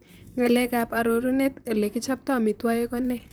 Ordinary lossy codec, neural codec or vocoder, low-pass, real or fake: none; vocoder, 44.1 kHz, 128 mel bands, Pupu-Vocoder; none; fake